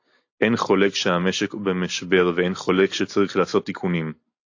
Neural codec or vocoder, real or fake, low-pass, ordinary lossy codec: none; real; 7.2 kHz; AAC, 48 kbps